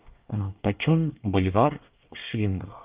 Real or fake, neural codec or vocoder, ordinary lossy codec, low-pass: fake; codec, 24 kHz, 1 kbps, SNAC; Opus, 24 kbps; 3.6 kHz